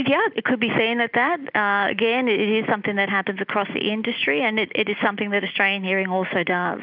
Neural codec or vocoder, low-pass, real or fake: none; 5.4 kHz; real